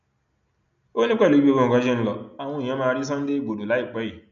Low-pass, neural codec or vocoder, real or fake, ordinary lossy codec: 7.2 kHz; none; real; MP3, 96 kbps